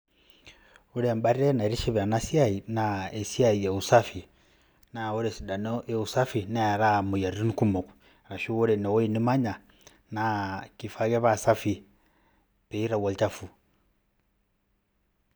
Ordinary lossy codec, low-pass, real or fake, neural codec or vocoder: none; none; real; none